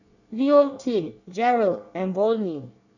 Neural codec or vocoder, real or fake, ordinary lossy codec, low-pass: codec, 24 kHz, 1 kbps, SNAC; fake; none; 7.2 kHz